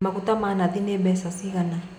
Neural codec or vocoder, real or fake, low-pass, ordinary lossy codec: none; real; 19.8 kHz; none